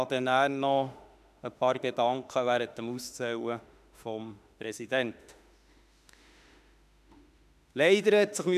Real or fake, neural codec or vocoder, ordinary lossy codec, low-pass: fake; autoencoder, 48 kHz, 32 numbers a frame, DAC-VAE, trained on Japanese speech; none; 14.4 kHz